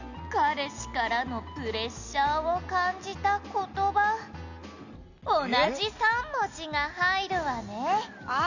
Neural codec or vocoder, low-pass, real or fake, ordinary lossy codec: none; 7.2 kHz; real; none